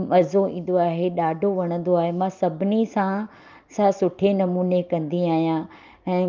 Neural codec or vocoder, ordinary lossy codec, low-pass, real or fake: none; Opus, 24 kbps; 7.2 kHz; real